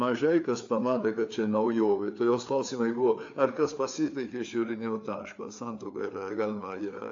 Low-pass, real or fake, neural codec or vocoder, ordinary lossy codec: 7.2 kHz; fake; codec, 16 kHz, 4 kbps, FreqCodec, larger model; AAC, 48 kbps